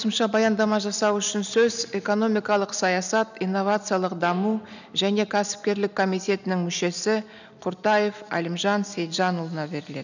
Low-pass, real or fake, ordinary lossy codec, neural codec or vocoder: 7.2 kHz; real; none; none